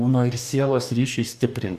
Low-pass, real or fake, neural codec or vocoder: 14.4 kHz; fake; codec, 44.1 kHz, 2.6 kbps, DAC